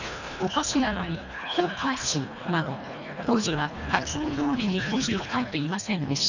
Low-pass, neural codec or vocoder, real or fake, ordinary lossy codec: 7.2 kHz; codec, 24 kHz, 1.5 kbps, HILCodec; fake; none